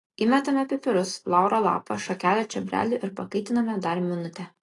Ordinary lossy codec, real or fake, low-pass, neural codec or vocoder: AAC, 32 kbps; real; 10.8 kHz; none